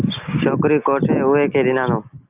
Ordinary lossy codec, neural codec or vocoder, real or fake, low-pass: Opus, 24 kbps; none; real; 3.6 kHz